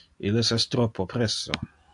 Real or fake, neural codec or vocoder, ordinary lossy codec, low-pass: real; none; AAC, 64 kbps; 10.8 kHz